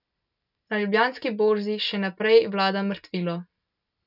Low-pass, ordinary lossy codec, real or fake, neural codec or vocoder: 5.4 kHz; none; real; none